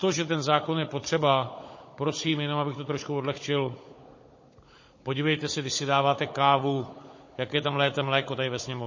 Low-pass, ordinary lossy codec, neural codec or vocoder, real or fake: 7.2 kHz; MP3, 32 kbps; codec, 16 kHz, 16 kbps, FunCodec, trained on Chinese and English, 50 frames a second; fake